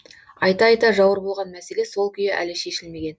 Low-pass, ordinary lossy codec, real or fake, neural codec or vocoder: none; none; real; none